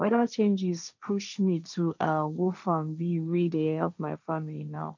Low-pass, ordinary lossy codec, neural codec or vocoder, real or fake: 7.2 kHz; none; codec, 16 kHz, 1.1 kbps, Voila-Tokenizer; fake